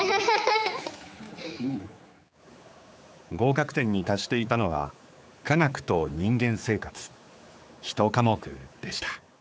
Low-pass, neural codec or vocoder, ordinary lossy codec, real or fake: none; codec, 16 kHz, 4 kbps, X-Codec, HuBERT features, trained on general audio; none; fake